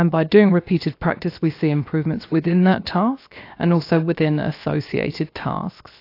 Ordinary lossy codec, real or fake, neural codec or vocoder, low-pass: AAC, 32 kbps; fake; codec, 16 kHz, 0.7 kbps, FocalCodec; 5.4 kHz